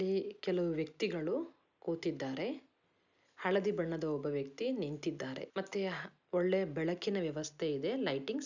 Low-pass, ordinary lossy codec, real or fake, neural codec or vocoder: 7.2 kHz; none; real; none